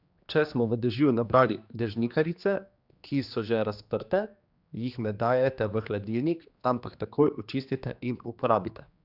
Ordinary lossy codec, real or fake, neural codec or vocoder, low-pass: Opus, 64 kbps; fake; codec, 16 kHz, 2 kbps, X-Codec, HuBERT features, trained on general audio; 5.4 kHz